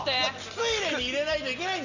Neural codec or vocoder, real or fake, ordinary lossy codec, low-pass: none; real; none; 7.2 kHz